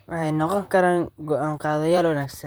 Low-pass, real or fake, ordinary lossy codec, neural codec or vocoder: none; fake; none; vocoder, 44.1 kHz, 128 mel bands, Pupu-Vocoder